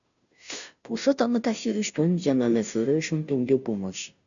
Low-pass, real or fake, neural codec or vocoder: 7.2 kHz; fake; codec, 16 kHz, 0.5 kbps, FunCodec, trained on Chinese and English, 25 frames a second